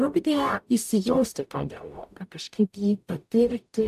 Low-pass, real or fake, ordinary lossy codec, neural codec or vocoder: 14.4 kHz; fake; AAC, 96 kbps; codec, 44.1 kHz, 0.9 kbps, DAC